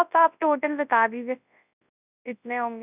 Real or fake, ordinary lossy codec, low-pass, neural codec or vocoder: fake; none; 3.6 kHz; codec, 24 kHz, 0.9 kbps, WavTokenizer, large speech release